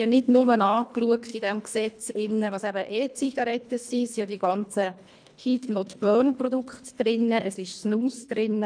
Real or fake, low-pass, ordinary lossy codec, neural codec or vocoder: fake; 9.9 kHz; none; codec, 24 kHz, 1.5 kbps, HILCodec